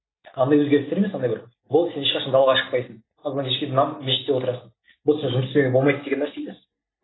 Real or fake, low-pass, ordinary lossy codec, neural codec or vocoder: real; 7.2 kHz; AAC, 16 kbps; none